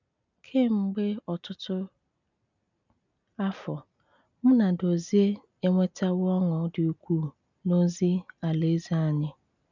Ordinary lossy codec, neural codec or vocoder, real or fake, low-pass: none; none; real; 7.2 kHz